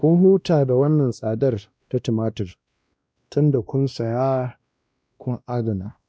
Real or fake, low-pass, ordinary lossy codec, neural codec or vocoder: fake; none; none; codec, 16 kHz, 1 kbps, X-Codec, WavLM features, trained on Multilingual LibriSpeech